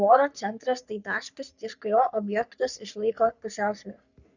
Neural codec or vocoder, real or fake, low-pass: codec, 44.1 kHz, 3.4 kbps, Pupu-Codec; fake; 7.2 kHz